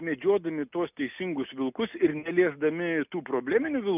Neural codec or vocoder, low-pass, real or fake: none; 3.6 kHz; real